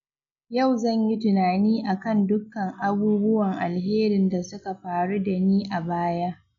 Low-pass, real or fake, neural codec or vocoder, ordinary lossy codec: 7.2 kHz; real; none; none